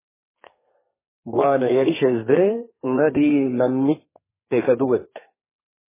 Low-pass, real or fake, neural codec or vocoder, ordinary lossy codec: 3.6 kHz; fake; codec, 32 kHz, 1.9 kbps, SNAC; MP3, 16 kbps